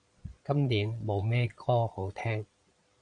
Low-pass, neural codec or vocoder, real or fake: 9.9 kHz; vocoder, 22.05 kHz, 80 mel bands, Vocos; fake